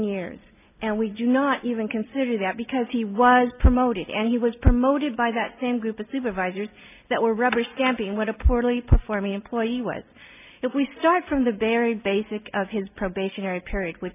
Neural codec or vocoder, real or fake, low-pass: none; real; 3.6 kHz